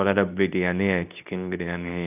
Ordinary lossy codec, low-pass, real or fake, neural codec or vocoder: none; 3.6 kHz; fake; codec, 24 kHz, 0.9 kbps, WavTokenizer, medium speech release version 2